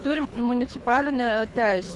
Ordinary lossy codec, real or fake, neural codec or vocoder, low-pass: Opus, 64 kbps; fake; codec, 24 kHz, 3 kbps, HILCodec; 10.8 kHz